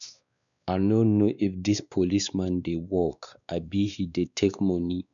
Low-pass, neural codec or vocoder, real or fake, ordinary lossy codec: 7.2 kHz; codec, 16 kHz, 2 kbps, X-Codec, WavLM features, trained on Multilingual LibriSpeech; fake; none